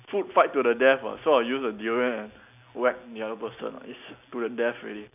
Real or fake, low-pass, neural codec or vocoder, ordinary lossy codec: real; 3.6 kHz; none; none